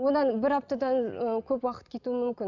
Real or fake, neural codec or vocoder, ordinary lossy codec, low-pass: real; none; none; 7.2 kHz